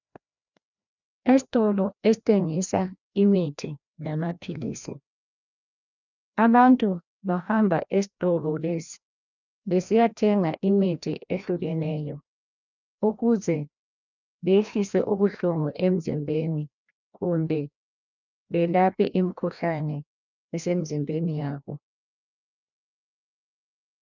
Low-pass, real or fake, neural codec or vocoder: 7.2 kHz; fake; codec, 16 kHz, 1 kbps, FreqCodec, larger model